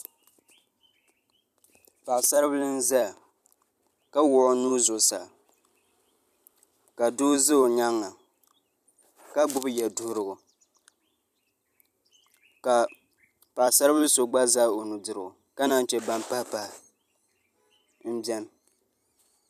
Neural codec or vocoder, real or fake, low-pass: vocoder, 44.1 kHz, 128 mel bands every 256 samples, BigVGAN v2; fake; 14.4 kHz